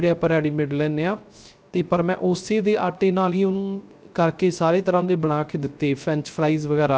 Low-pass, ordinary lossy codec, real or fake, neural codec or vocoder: none; none; fake; codec, 16 kHz, 0.3 kbps, FocalCodec